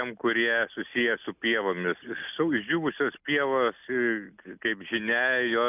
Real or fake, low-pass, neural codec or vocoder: real; 3.6 kHz; none